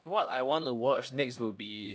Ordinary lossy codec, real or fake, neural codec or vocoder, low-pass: none; fake; codec, 16 kHz, 1 kbps, X-Codec, HuBERT features, trained on LibriSpeech; none